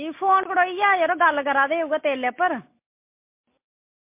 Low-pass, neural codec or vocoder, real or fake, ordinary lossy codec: 3.6 kHz; none; real; MP3, 24 kbps